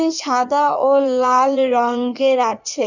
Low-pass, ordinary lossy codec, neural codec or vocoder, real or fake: 7.2 kHz; none; codec, 16 kHz in and 24 kHz out, 1.1 kbps, FireRedTTS-2 codec; fake